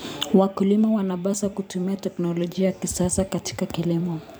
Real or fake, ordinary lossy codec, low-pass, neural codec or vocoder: fake; none; none; vocoder, 44.1 kHz, 128 mel bands every 256 samples, BigVGAN v2